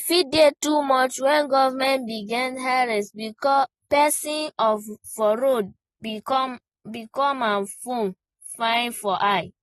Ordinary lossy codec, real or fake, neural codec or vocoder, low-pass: AAC, 32 kbps; real; none; 19.8 kHz